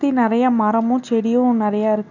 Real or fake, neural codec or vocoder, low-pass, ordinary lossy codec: real; none; 7.2 kHz; none